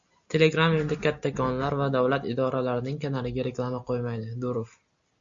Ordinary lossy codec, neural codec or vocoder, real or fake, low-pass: Opus, 64 kbps; none; real; 7.2 kHz